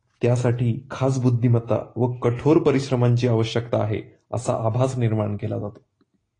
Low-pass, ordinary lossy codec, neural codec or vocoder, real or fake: 9.9 kHz; AAC, 32 kbps; none; real